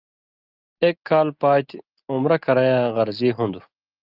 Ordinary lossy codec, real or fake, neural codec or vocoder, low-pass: Opus, 32 kbps; real; none; 5.4 kHz